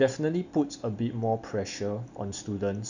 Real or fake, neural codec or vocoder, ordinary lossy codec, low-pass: real; none; none; 7.2 kHz